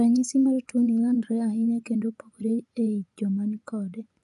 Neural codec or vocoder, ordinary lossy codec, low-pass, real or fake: vocoder, 24 kHz, 100 mel bands, Vocos; none; 10.8 kHz; fake